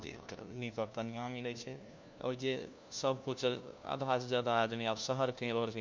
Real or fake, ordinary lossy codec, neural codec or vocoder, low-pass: fake; none; codec, 16 kHz, 1 kbps, FunCodec, trained on LibriTTS, 50 frames a second; none